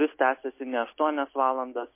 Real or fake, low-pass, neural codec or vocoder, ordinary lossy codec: real; 3.6 kHz; none; MP3, 24 kbps